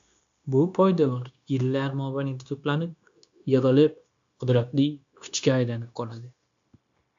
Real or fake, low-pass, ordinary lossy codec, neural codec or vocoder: fake; 7.2 kHz; AAC, 64 kbps; codec, 16 kHz, 0.9 kbps, LongCat-Audio-Codec